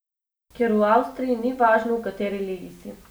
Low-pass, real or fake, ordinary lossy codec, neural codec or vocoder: none; real; none; none